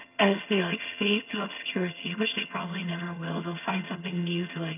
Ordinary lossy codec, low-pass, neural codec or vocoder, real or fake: none; 3.6 kHz; vocoder, 22.05 kHz, 80 mel bands, HiFi-GAN; fake